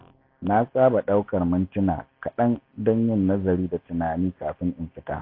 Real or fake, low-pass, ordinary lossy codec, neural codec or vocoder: real; 5.4 kHz; AAC, 32 kbps; none